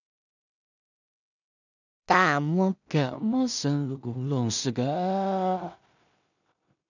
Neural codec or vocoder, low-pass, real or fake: codec, 16 kHz in and 24 kHz out, 0.4 kbps, LongCat-Audio-Codec, two codebook decoder; 7.2 kHz; fake